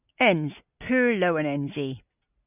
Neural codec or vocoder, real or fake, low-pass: none; real; 3.6 kHz